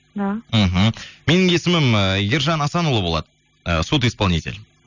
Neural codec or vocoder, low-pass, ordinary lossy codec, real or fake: none; 7.2 kHz; none; real